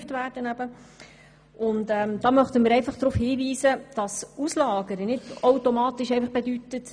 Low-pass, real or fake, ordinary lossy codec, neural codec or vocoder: 9.9 kHz; real; none; none